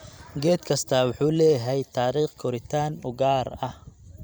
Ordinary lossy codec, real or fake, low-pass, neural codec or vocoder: none; fake; none; vocoder, 44.1 kHz, 128 mel bands every 256 samples, BigVGAN v2